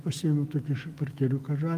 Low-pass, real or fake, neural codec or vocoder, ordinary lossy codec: 14.4 kHz; fake; autoencoder, 48 kHz, 128 numbers a frame, DAC-VAE, trained on Japanese speech; Opus, 32 kbps